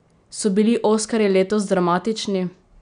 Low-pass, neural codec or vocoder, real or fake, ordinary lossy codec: 9.9 kHz; none; real; none